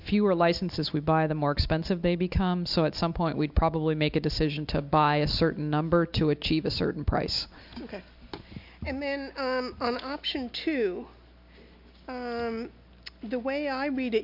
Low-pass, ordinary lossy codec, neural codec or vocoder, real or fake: 5.4 kHz; MP3, 48 kbps; none; real